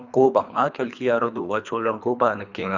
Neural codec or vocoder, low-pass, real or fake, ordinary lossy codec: codec, 24 kHz, 3 kbps, HILCodec; 7.2 kHz; fake; none